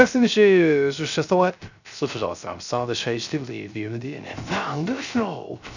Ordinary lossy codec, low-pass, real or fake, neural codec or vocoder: none; 7.2 kHz; fake; codec, 16 kHz, 0.3 kbps, FocalCodec